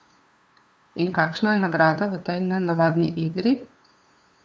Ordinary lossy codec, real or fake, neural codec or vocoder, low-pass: none; fake; codec, 16 kHz, 2 kbps, FunCodec, trained on LibriTTS, 25 frames a second; none